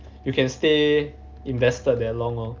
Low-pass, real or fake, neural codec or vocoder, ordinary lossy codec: 7.2 kHz; real; none; Opus, 24 kbps